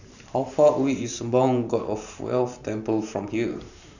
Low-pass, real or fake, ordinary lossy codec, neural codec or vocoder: 7.2 kHz; fake; none; vocoder, 44.1 kHz, 128 mel bands every 512 samples, BigVGAN v2